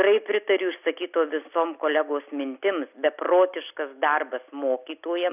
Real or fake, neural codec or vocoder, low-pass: real; none; 3.6 kHz